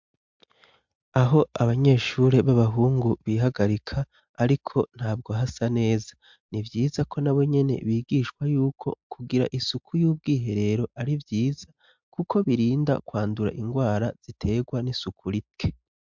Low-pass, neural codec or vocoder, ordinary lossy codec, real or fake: 7.2 kHz; none; MP3, 64 kbps; real